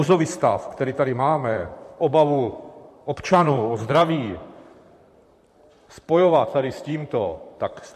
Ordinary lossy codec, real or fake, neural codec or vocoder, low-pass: MP3, 64 kbps; fake; vocoder, 44.1 kHz, 128 mel bands, Pupu-Vocoder; 14.4 kHz